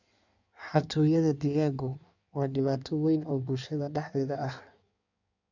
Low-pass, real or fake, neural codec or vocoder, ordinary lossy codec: 7.2 kHz; fake; codec, 16 kHz in and 24 kHz out, 1.1 kbps, FireRedTTS-2 codec; none